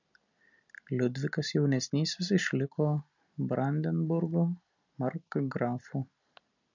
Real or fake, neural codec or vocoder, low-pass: real; none; 7.2 kHz